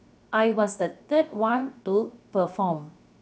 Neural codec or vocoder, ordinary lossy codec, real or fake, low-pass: codec, 16 kHz, 0.7 kbps, FocalCodec; none; fake; none